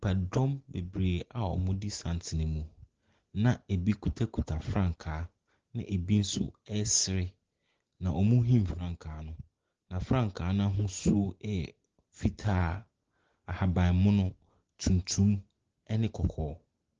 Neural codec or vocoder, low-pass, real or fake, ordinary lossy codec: none; 7.2 kHz; real; Opus, 16 kbps